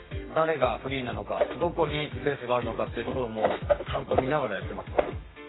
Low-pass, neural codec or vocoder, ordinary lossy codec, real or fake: 7.2 kHz; codec, 32 kHz, 1.9 kbps, SNAC; AAC, 16 kbps; fake